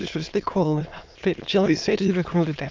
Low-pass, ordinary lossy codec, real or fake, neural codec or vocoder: 7.2 kHz; Opus, 24 kbps; fake; autoencoder, 22.05 kHz, a latent of 192 numbers a frame, VITS, trained on many speakers